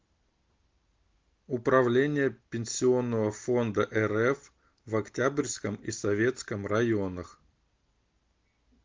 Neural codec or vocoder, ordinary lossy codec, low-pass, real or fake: none; Opus, 24 kbps; 7.2 kHz; real